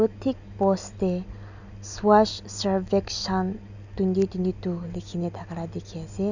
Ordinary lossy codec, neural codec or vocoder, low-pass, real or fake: none; none; 7.2 kHz; real